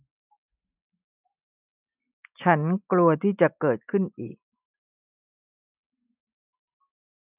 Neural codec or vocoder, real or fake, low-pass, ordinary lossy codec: none; real; 3.6 kHz; none